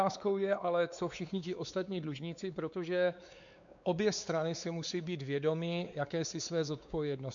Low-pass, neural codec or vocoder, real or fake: 7.2 kHz; codec, 16 kHz, 4 kbps, X-Codec, WavLM features, trained on Multilingual LibriSpeech; fake